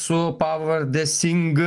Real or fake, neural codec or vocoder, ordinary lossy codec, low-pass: real; none; Opus, 32 kbps; 10.8 kHz